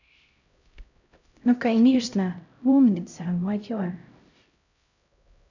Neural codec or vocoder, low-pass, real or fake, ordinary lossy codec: codec, 16 kHz, 0.5 kbps, X-Codec, HuBERT features, trained on LibriSpeech; 7.2 kHz; fake; none